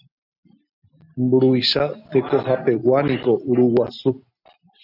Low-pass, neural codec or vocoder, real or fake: 5.4 kHz; none; real